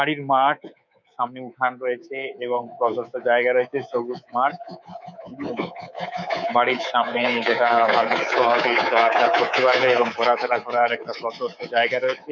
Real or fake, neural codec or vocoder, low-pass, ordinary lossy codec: fake; codec, 24 kHz, 3.1 kbps, DualCodec; 7.2 kHz; none